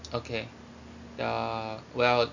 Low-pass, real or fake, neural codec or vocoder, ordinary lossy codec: 7.2 kHz; real; none; none